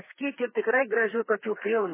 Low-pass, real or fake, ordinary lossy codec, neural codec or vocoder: 3.6 kHz; fake; MP3, 16 kbps; codec, 32 kHz, 1.9 kbps, SNAC